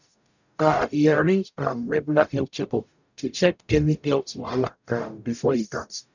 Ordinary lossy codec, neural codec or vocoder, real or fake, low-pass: none; codec, 44.1 kHz, 0.9 kbps, DAC; fake; 7.2 kHz